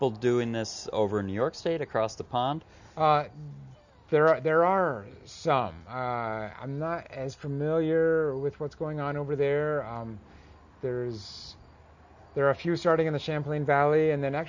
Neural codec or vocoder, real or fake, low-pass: none; real; 7.2 kHz